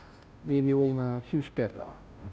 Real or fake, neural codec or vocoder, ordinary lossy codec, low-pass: fake; codec, 16 kHz, 0.5 kbps, FunCodec, trained on Chinese and English, 25 frames a second; none; none